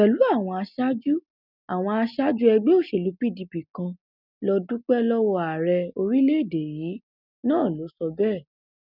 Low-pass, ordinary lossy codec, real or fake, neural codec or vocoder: 5.4 kHz; none; real; none